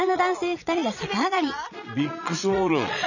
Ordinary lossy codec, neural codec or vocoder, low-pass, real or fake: none; vocoder, 22.05 kHz, 80 mel bands, Vocos; 7.2 kHz; fake